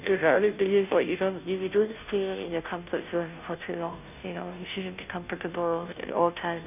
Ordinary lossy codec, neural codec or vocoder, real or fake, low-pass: none; codec, 16 kHz, 0.5 kbps, FunCodec, trained on Chinese and English, 25 frames a second; fake; 3.6 kHz